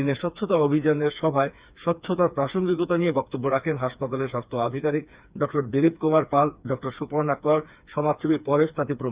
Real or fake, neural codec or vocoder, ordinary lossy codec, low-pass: fake; codec, 16 kHz, 4 kbps, FreqCodec, smaller model; Opus, 64 kbps; 3.6 kHz